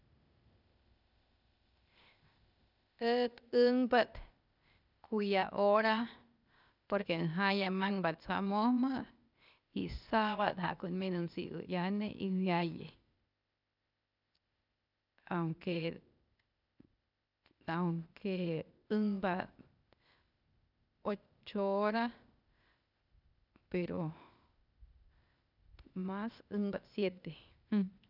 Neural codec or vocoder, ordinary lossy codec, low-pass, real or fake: codec, 16 kHz, 0.8 kbps, ZipCodec; none; 5.4 kHz; fake